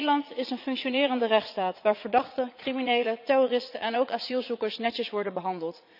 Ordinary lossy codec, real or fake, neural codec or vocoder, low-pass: none; fake; vocoder, 44.1 kHz, 80 mel bands, Vocos; 5.4 kHz